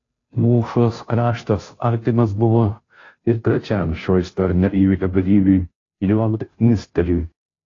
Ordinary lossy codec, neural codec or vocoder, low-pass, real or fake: AAC, 32 kbps; codec, 16 kHz, 0.5 kbps, FunCodec, trained on Chinese and English, 25 frames a second; 7.2 kHz; fake